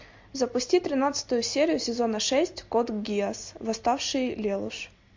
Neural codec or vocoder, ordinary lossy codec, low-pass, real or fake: none; MP3, 48 kbps; 7.2 kHz; real